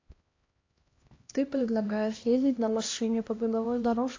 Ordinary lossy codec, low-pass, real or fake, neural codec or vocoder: AAC, 32 kbps; 7.2 kHz; fake; codec, 16 kHz, 1 kbps, X-Codec, HuBERT features, trained on LibriSpeech